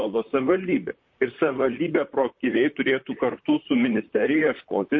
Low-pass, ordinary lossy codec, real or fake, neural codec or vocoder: 7.2 kHz; MP3, 32 kbps; fake; vocoder, 44.1 kHz, 128 mel bands, Pupu-Vocoder